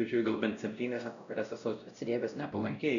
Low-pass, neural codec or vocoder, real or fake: 7.2 kHz; codec, 16 kHz, 0.5 kbps, X-Codec, WavLM features, trained on Multilingual LibriSpeech; fake